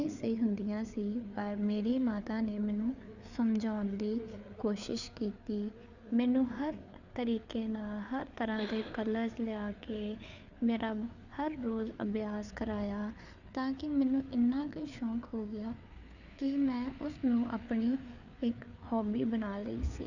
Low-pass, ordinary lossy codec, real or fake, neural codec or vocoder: 7.2 kHz; none; fake; codec, 16 kHz, 4 kbps, FreqCodec, larger model